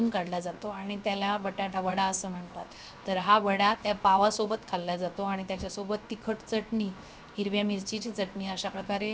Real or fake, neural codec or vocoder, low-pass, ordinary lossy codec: fake; codec, 16 kHz, 0.7 kbps, FocalCodec; none; none